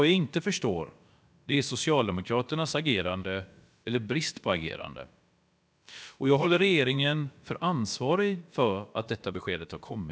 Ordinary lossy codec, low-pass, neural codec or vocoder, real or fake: none; none; codec, 16 kHz, about 1 kbps, DyCAST, with the encoder's durations; fake